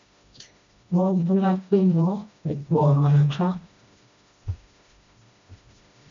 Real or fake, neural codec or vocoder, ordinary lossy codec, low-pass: fake; codec, 16 kHz, 1 kbps, FreqCodec, smaller model; MP3, 64 kbps; 7.2 kHz